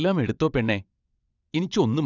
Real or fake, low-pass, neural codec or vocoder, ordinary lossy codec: fake; 7.2 kHz; vocoder, 22.05 kHz, 80 mel bands, WaveNeXt; none